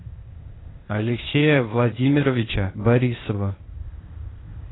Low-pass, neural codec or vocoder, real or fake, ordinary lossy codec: 7.2 kHz; codec, 16 kHz, 0.8 kbps, ZipCodec; fake; AAC, 16 kbps